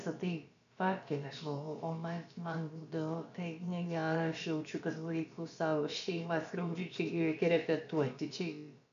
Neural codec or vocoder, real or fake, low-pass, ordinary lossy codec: codec, 16 kHz, about 1 kbps, DyCAST, with the encoder's durations; fake; 7.2 kHz; AAC, 96 kbps